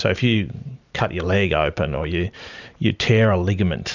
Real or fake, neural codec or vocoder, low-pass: fake; vocoder, 44.1 kHz, 128 mel bands every 512 samples, BigVGAN v2; 7.2 kHz